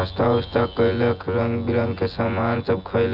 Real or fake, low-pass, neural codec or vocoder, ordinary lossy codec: fake; 5.4 kHz; vocoder, 24 kHz, 100 mel bands, Vocos; none